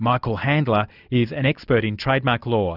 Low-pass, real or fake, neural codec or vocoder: 5.4 kHz; real; none